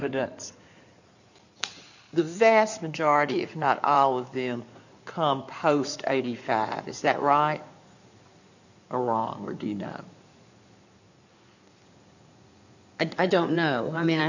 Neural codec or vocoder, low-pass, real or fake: codec, 16 kHz in and 24 kHz out, 2.2 kbps, FireRedTTS-2 codec; 7.2 kHz; fake